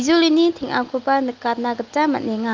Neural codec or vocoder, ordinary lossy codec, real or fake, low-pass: none; Opus, 24 kbps; real; 7.2 kHz